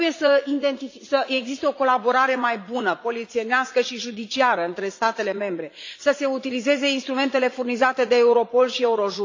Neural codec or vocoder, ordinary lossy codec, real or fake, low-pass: vocoder, 44.1 kHz, 80 mel bands, Vocos; AAC, 48 kbps; fake; 7.2 kHz